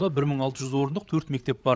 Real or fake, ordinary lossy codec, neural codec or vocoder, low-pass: fake; none; codec, 16 kHz, 8 kbps, FreqCodec, larger model; none